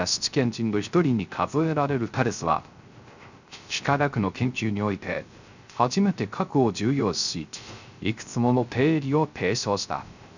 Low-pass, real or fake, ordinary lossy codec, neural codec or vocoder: 7.2 kHz; fake; none; codec, 16 kHz, 0.3 kbps, FocalCodec